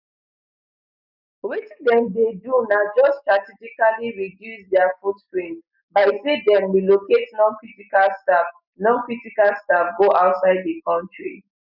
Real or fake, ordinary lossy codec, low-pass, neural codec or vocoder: real; none; 5.4 kHz; none